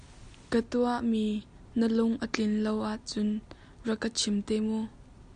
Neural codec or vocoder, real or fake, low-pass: none; real; 9.9 kHz